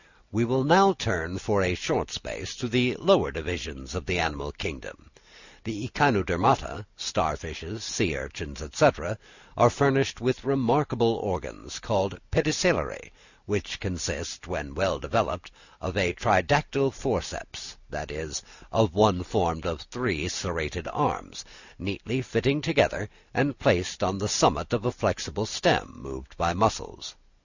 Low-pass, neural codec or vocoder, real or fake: 7.2 kHz; none; real